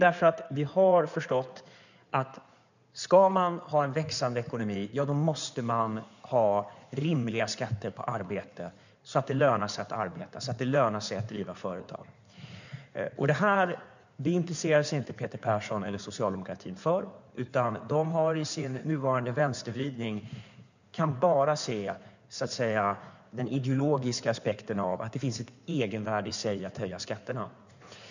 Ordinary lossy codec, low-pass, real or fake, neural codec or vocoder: none; 7.2 kHz; fake; codec, 16 kHz in and 24 kHz out, 2.2 kbps, FireRedTTS-2 codec